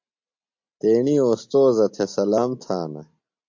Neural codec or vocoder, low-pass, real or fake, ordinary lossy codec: none; 7.2 kHz; real; MP3, 48 kbps